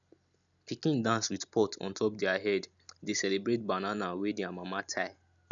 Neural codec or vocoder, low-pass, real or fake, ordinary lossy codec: none; 7.2 kHz; real; none